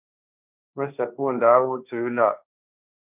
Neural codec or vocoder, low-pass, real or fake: codec, 16 kHz, 1.1 kbps, Voila-Tokenizer; 3.6 kHz; fake